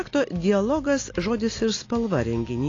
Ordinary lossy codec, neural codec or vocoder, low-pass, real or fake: AAC, 32 kbps; none; 7.2 kHz; real